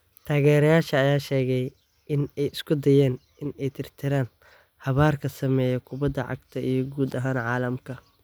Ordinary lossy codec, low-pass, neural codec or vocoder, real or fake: none; none; none; real